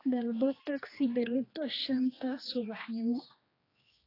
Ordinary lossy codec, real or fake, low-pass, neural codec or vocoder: AAC, 24 kbps; fake; 5.4 kHz; codec, 16 kHz, 2 kbps, X-Codec, HuBERT features, trained on general audio